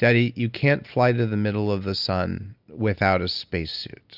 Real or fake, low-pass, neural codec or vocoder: real; 5.4 kHz; none